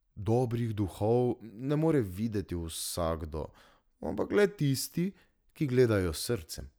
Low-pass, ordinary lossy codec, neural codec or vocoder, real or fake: none; none; none; real